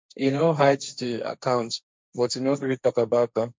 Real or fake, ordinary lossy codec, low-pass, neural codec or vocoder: fake; none; none; codec, 16 kHz, 1.1 kbps, Voila-Tokenizer